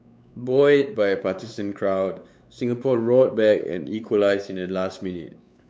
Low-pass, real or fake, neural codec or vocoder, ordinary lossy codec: none; fake; codec, 16 kHz, 4 kbps, X-Codec, WavLM features, trained on Multilingual LibriSpeech; none